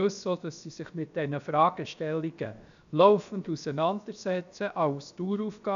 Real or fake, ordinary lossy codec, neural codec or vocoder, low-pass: fake; none; codec, 16 kHz, about 1 kbps, DyCAST, with the encoder's durations; 7.2 kHz